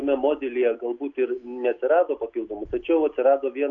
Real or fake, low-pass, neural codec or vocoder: real; 7.2 kHz; none